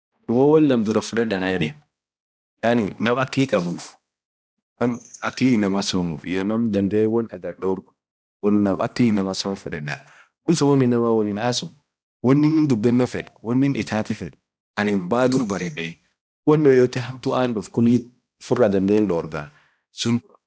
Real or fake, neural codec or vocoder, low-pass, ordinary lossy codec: fake; codec, 16 kHz, 1 kbps, X-Codec, HuBERT features, trained on balanced general audio; none; none